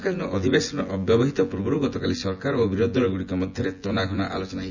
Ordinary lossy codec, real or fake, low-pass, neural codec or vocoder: none; fake; 7.2 kHz; vocoder, 24 kHz, 100 mel bands, Vocos